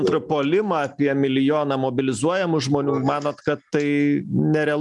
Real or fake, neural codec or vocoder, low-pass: real; none; 10.8 kHz